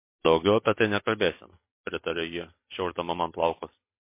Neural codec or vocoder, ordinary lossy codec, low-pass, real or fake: none; MP3, 24 kbps; 3.6 kHz; real